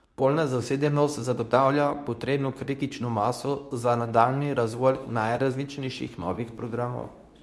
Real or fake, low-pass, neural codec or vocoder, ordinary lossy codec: fake; none; codec, 24 kHz, 0.9 kbps, WavTokenizer, medium speech release version 2; none